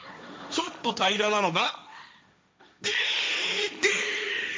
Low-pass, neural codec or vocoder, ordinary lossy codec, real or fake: none; codec, 16 kHz, 1.1 kbps, Voila-Tokenizer; none; fake